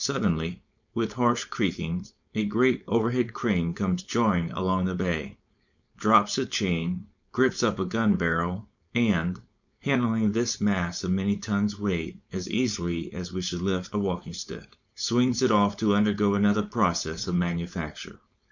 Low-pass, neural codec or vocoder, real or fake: 7.2 kHz; codec, 16 kHz, 4.8 kbps, FACodec; fake